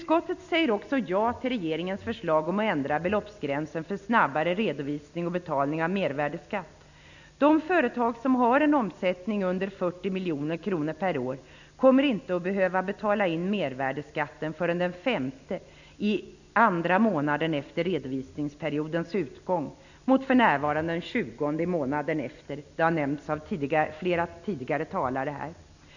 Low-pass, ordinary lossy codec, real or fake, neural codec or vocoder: 7.2 kHz; none; real; none